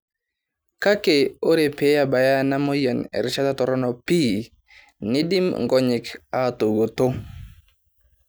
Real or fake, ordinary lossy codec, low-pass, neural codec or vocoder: real; none; none; none